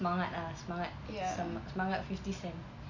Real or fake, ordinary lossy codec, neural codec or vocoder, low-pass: real; MP3, 48 kbps; none; 7.2 kHz